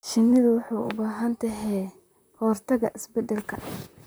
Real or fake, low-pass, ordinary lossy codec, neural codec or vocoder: fake; none; none; vocoder, 44.1 kHz, 128 mel bands, Pupu-Vocoder